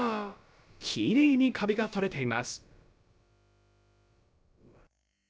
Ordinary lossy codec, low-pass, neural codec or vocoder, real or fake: none; none; codec, 16 kHz, about 1 kbps, DyCAST, with the encoder's durations; fake